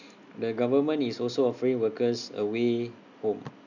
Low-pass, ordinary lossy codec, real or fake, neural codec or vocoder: 7.2 kHz; none; real; none